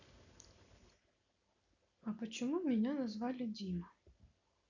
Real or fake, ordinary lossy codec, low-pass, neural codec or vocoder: real; none; 7.2 kHz; none